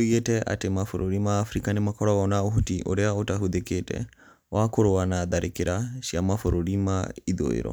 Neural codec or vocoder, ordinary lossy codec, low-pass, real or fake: none; none; none; real